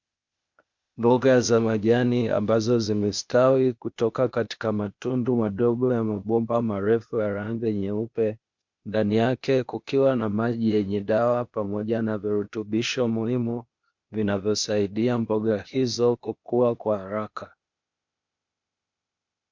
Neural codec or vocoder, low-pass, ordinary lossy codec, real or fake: codec, 16 kHz, 0.8 kbps, ZipCodec; 7.2 kHz; MP3, 64 kbps; fake